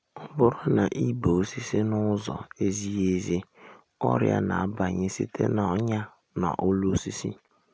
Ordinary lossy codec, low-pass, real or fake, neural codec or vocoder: none; none; real; none